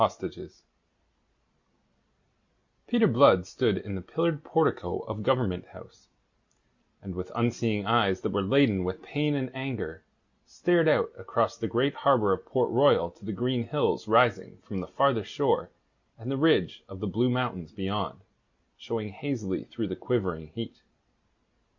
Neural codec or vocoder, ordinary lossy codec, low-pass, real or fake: none; Opus, 64 kbps; 7.2 kHz; real